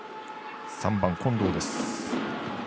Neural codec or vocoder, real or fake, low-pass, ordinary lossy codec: none; real; none; none